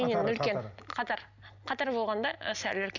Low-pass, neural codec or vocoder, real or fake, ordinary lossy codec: 7.2 kHz; none; real; none